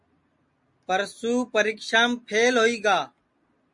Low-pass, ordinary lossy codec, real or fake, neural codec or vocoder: 10.8 kHz; MP3, 48 kbps; real; none